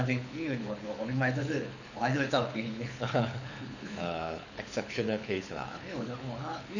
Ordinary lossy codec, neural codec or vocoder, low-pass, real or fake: none; codec, 24 kHz, 6 kbps, HILCodec; 7.2 kHz; fake